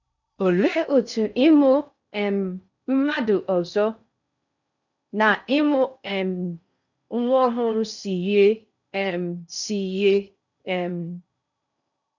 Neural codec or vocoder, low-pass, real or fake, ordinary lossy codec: codec, 16 kHz in and 24 kHz out, 0.6 kbps, FocalCodec, streaming, 4096 codes; 7.2 kHz; fake; none